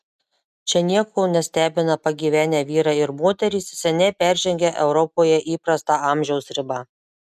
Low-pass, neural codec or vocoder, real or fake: 14.4 kHz; none; real